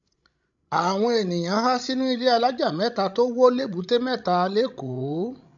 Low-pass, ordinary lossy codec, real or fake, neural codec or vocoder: 7.2 kHz; none; fake; codec, 16 kHz, 16 kbps, FreqCodec, larger model